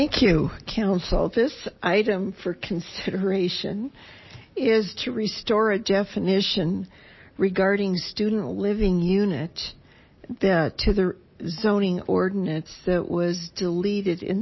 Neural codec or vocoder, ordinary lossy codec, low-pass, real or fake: none; MP3, 24 kbps; 7.2 kHz; real